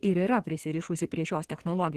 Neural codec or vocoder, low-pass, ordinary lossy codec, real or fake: codec, 44.1 kHz, 2.6 kbps, SNAC; 14.4 kHz; Opus, 16 kbps; fake